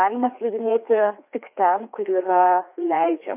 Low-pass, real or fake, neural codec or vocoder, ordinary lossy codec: 3.6 kHz; fake; codec, 16 kHz, 2 kbps, FreqCodec, larger model; MP3, 32 kbps